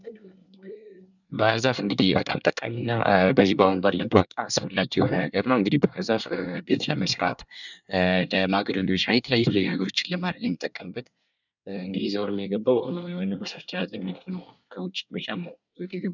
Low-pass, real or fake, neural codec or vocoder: 7.2 kHz; fake; codec, 24 kHz, 1 kbps, SNAC